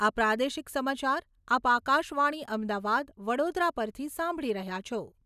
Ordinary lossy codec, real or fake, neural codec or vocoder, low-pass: none; real; none; 14.4 kHz